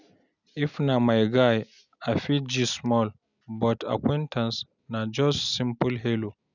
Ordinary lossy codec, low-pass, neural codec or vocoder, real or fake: none; 7.2 kHz; none; real